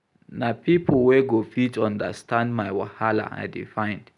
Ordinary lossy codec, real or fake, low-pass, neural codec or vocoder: none; real; 10.8 kHz; none